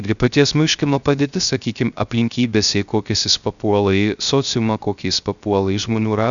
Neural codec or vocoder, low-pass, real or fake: codec, 16 kHz, 0.3 kbps, FocalCodec; 7.2 kHz; fake